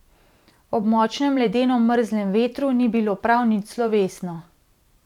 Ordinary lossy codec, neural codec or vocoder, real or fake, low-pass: none; vocoder, 44.1 kHz, 128 mel bands every 512 samples, BigVGAN v2; fake; 19.8 kHz